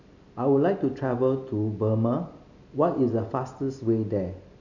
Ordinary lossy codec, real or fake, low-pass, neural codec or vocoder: none; real; 7.2 kHz; none